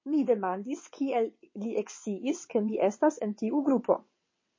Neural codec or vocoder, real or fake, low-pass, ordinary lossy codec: vocoder, 22.05 kHz, 80 mel bands, WaveNeXt; fake; 7.2 kHz; MP3, 32 kbps